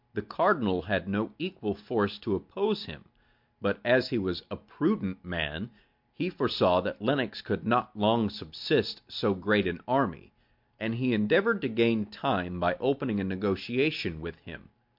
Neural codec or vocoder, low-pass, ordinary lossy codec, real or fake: none; 5.4 kHz; MP3, 48 kbps; real